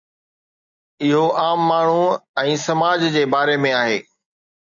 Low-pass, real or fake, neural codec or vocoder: 7.2 kHz; real; none